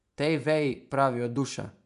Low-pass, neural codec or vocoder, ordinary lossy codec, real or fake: 10.8 kHz; vocoder, 24 kHz, 100 mel bands, Vocos; MP3, 96 kbps; fake